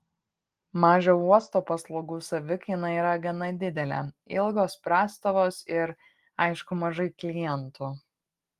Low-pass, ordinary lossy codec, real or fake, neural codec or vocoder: 14.4 kHz; Opus, 24 kbps; real; none